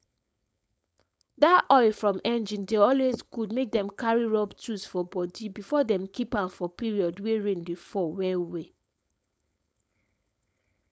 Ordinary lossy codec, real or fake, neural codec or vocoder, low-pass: none; fake; codec, 16 kHz, 4.8 kbps, FACodec; none